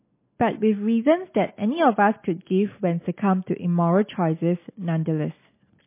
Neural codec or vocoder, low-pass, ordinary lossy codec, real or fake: none; 3.6 kHz; MP3, 24 kbps; real